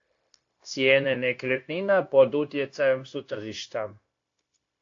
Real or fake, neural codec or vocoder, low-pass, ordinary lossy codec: fake; codec, 16 kHz, 0.9 kbps, LongCat-Audio-Codec; 7.2 kHz; AAC, 48 kbps